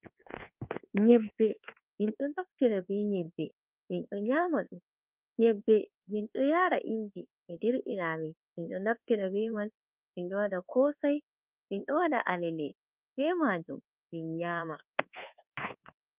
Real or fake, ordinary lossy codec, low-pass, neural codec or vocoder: fake; Opus, 24 kbps; 3.6 kHz; codec, 24 kHz, 1.2 kbps, DualCodec